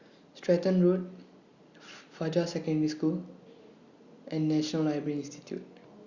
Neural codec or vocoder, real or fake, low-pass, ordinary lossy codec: none; real; 7.2 kHz; Opus, 64 kbps